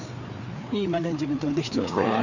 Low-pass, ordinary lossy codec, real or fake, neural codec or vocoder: 7.2 kHz; none; fake; codec, 16 kHz, 4 kbps, FreqCodec, larger model